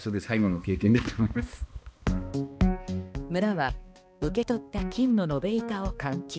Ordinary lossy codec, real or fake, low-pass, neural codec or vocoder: none; fake; none; codec, 16 kHz, 2 kbps, X-Codec, HuBERT features, trained on balanced general audio